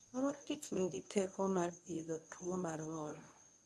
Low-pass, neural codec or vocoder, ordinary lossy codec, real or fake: none; codec, 24 kHz, 0.9 kbps, WavTokenizer, medium speech release version 1; none; fake